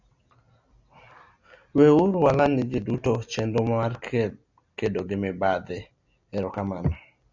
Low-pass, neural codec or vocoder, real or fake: 7.2 kHz; none; real